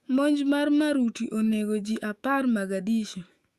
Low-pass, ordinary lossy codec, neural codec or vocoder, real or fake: 14.4 kHz; Opus, 64 kbps; autoencoder, 48 kHz, 128 numbers a frame, DAC-VAE, trained on Japanese speech; fake